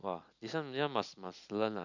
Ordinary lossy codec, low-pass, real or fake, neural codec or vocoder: none; 7.2 kHz; real; none